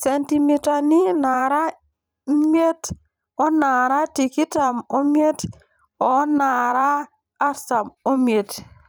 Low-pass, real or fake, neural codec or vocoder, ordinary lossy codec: none; fake; vocoder, 44.1 kHz, 128 mel bands every 512 samples, BigVGAN v2; none